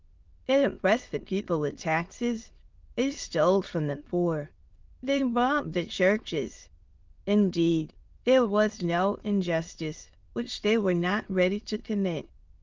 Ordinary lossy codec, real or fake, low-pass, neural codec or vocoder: Opus, 32 kbps; fake; 7.2 kHz; autoencoder, 22.05 kHz, a latent of 192 numbers a frame, VITS, trained on many speakers